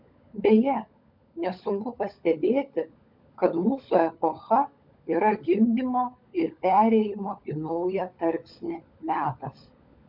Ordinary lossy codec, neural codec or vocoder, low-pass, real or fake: MP3, 48 kbps; codec, 16 kHz, 16 kbps, FunCodec, trained on LibriTTS, 50 frames a second; 5.4 kHz; fake